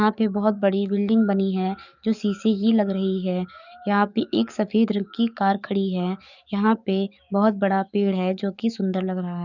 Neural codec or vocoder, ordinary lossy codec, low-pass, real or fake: codec, 44.1 kHz, 7.8 kbps, DAC; none; 7.2 kHz; fake